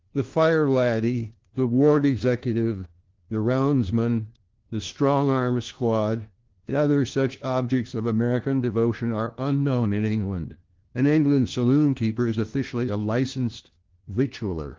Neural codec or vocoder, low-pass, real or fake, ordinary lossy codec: codec, 16 kHz, 1 kbps, FunCodec, trained on LibriTTS, 50 frames a second; 7.2 kHz; fake; Opus, 16 kbps